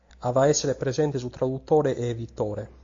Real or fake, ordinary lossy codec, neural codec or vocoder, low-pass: real; AAC, 48 kbps; none; 7.2 kHz